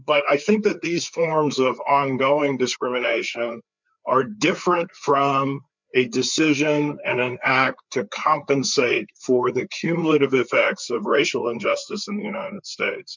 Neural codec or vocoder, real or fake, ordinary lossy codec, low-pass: codec, 16 kHz, 4 kbps, FreqCodec, larger model; fake; MP3, 64 kbps; 7.2 kHz